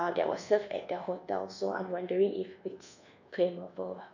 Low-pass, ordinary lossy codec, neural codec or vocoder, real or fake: 7.2 kHz; none; codec, 24 kHz, 1.2 kbps, DualCodec; fake